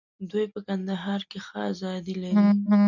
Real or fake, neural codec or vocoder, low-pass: real; none; 7.2 kHz